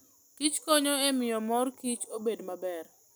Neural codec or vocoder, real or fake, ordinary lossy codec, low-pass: none; real; none; none